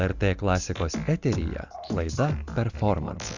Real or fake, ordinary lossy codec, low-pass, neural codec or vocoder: real; Opus, 64 kbps; 7.2 kHz; none